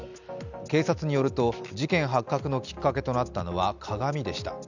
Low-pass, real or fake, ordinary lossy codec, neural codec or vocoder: 7.2 kHz; real; none; none